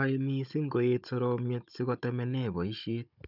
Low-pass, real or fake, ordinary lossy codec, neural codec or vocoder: 5.4 kHz; fake; none; codec, 16 kHz, 16 kbps, FunCodec, trained on Chinese and English, 50 frames a second